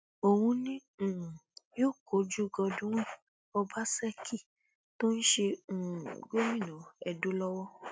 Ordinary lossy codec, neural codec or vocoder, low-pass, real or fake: none; none; none; real